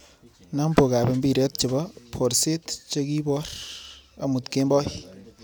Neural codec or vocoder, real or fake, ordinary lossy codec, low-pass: none; real; none; none